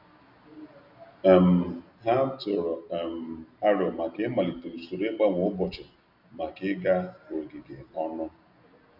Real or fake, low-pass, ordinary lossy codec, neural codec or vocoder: real; 5.4 kHz; none; none